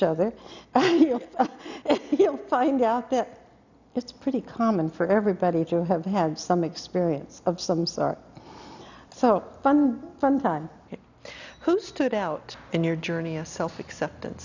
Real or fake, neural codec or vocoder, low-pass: real; none; 7.2 kHz